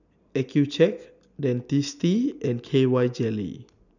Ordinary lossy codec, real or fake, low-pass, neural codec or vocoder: none; real; 7.2 kHz; none